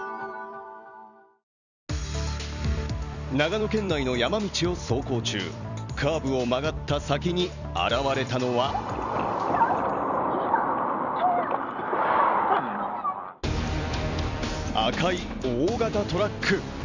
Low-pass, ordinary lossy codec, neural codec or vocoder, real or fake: 7.2 kHz; none; vocoder, 44.1 kHz, 128 mel bands every 256 samples, BigVGAN v2; fake